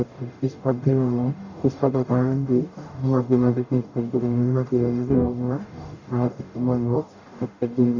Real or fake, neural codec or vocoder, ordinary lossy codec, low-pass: fake; codec, 44.1 kHz, 0.9 kbps, DAC; none; 7.2 kHz